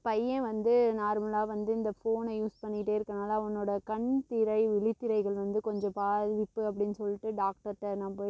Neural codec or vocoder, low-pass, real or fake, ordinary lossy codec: none; none; real; none